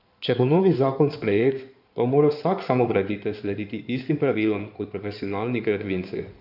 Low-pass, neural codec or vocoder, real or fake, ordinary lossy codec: 5.4 kHz; codec, 16 kHz in and 24 kHz out, 2.2 kbps, FireRedTTS-2 codec; fake; none